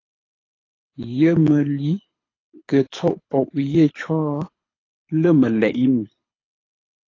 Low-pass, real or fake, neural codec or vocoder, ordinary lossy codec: 7.2 kHz; fake; codec, 24 kHz, 6 kbps, HILCodec; AAC, 32 kbps